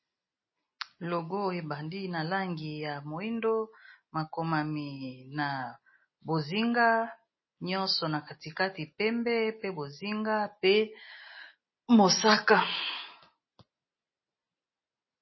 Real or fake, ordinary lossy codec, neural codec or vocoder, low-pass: real; MP3, 24 kbps; none; 7.2 kHz